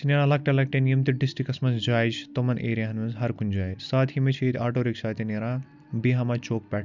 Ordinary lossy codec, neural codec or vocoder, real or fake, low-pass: none; none; real; 7.2 kHz